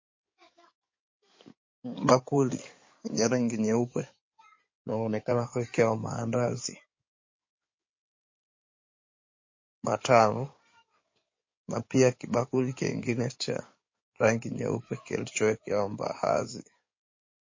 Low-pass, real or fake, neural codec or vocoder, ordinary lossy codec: 7.2 kHz; fake; codec, 16 kHz in and 24 kHz out, 2.2 kbps, FireRedTTS-2 codec; MP3, 32 kbps